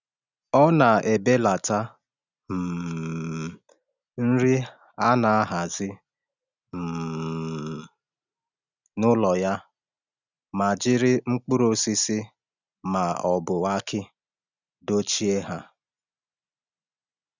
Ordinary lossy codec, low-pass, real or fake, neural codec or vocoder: none; 7.2 kHz; real; none